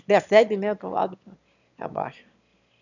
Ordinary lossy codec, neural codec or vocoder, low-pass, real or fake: none; autoencoder, 22.05 kHz, a latent of 192 numbers a frame, VITS, trained on one speaker; 7.2 kHz; fake